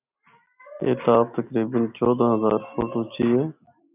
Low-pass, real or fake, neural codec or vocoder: 3.6 kHz; real; none